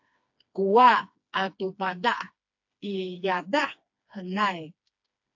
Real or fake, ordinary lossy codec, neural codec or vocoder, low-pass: fake; AAC, 48 kbps; codec, 16 kHz, 2 kbps, FreqCodec, smaller model; 7.2 kHz